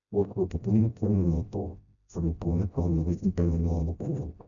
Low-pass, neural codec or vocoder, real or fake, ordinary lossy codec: 7.2 kHz; codec, 16 kHz, 0.5 kbps, FreqCodec, smaller model; fake; none